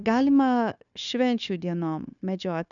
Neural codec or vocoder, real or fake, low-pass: codec, 16 kHz, 0.9 kbps, LongCat-Audio-Codec; fake; 7.2 kHz